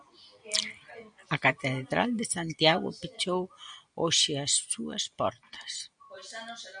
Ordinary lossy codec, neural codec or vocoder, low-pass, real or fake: MP3, 96 kbps; none; 9.9 kHz; real